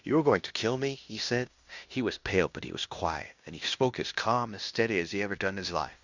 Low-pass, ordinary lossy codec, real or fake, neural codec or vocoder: 7.2 kHz; Opus, 64 kbps; fake; codec, 16 kHz in and 24 kHz out, 0.9 kbps, LongCat-Audio-Codec, fine tuned four codebook decoder